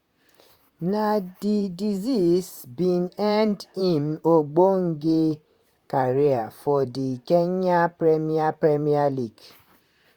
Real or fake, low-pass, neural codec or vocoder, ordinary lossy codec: fake; 19.8 kHz; vocoder, 44.1 kHz, 128 mel bands, Pupu-Vocoder; Opus, 64 kbps